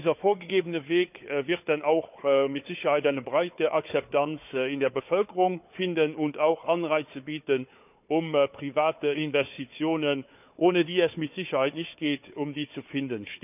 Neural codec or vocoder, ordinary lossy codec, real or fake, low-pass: codec, 16 kHz, 4 kbps, FunCodec, trained on Chinese and English, 50 frames a second; none; fake; 3.6 kHz